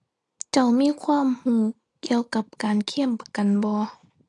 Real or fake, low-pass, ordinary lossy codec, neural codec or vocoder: real; 10.8 kHz; AAC, 48 kbps; none